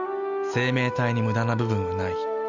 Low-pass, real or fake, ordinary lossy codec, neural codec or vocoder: 7.2 kHz; real; none; none